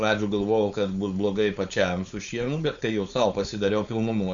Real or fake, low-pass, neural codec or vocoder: fake; 7.2 kHz; codec, 16 kHz, 4.8 kbps, FACodec